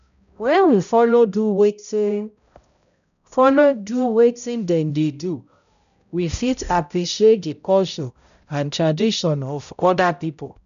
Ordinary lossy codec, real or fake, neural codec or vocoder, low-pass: none; fake; codec, 16 kHz, 0.5 kbps, X-Codec, HuBERT features, trained on balanced general audio; 7.2 kHz